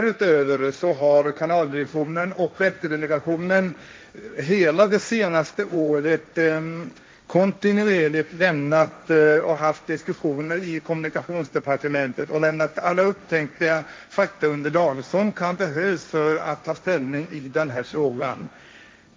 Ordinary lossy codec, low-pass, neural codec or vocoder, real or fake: none; none; codec, 16 kHz, 1.1 kbps, Voila-Tokenizer; fake